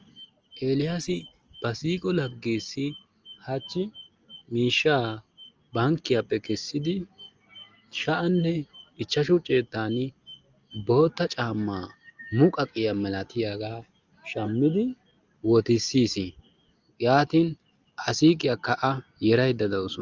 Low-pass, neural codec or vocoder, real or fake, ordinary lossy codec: 7.2 kHz; none; real; Opus, 24 kbps